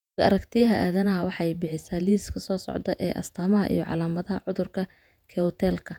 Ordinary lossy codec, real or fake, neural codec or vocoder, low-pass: none; fake; vocoder, 48 kHz, 128 mel bands, Vocos; 19.8 kHz